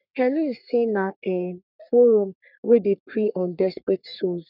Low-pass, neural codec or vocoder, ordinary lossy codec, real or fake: 5.4 kHz; codec, 44.1 kHz, 3.4 kbps, Pupu-Codec; none; fake